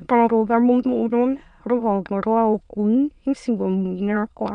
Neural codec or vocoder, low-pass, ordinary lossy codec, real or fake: autoencoder, 22.05 kHz, a latent of 192 numbers a frame, VITS, trained on many speakers; 9.9 kHz; MP3, 64 kbps; fake